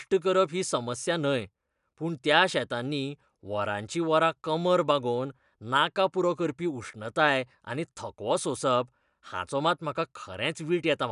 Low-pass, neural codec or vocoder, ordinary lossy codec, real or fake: 10.8 kHz; none; none; real